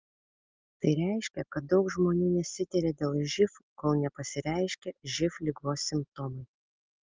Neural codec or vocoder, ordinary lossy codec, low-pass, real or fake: none; Opus, 24 kbps; 7.2 kHz; real